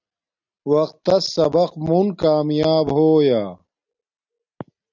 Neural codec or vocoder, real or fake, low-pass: none; real; 7.2 kHz